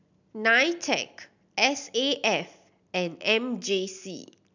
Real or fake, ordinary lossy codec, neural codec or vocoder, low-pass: real; none; none; 7.2 kHz